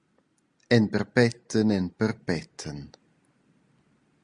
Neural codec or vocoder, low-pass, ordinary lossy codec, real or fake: none; 9.9 kHz; Opus, 64 kbps; real